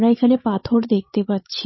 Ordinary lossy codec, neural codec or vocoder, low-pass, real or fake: MP3, 24 kbps; codec, 16 kHz, 16 kbps, FreqCodec, larger model; 7.2 kHz; fake